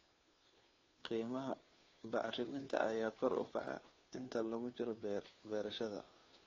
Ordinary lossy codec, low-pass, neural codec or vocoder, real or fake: AAC, 32 kbps; 7.2 kHz; codec, 16 kHz, 2 kbps, FunCodec, trained on Chinese and English, 25 frames a second; fake